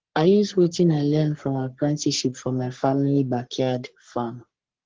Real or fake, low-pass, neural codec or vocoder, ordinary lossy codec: fake; 7.2 kHz; codec, 44.1 kHz, 3.4 kbps, Pupu-Codec; Opus, 16 kbps